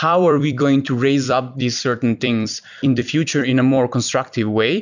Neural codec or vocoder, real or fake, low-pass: vocoder, 44.1 kHz, 128 mel bands every 256 samples, BigVGAN v2; fake; 7.2 kHz